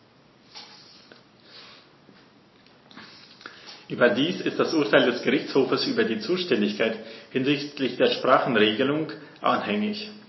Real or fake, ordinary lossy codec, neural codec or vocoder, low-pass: real; MP3, 24 kbps; none; 7.2 kHz